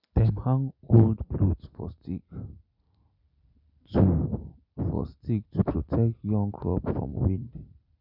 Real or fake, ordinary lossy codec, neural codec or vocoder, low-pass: real; none; none; 5.4 kHz